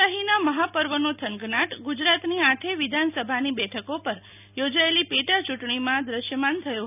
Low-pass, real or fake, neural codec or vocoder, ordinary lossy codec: 3.6 kHz; real; none; none